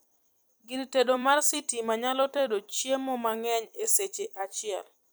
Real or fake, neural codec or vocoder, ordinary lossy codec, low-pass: fake; vocoder, 44.1 kHz, 128 mel bands every 256 samples, BigVGAN v2; none; none